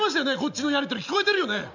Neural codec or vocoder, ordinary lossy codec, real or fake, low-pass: none; none; real; 7.2 kHz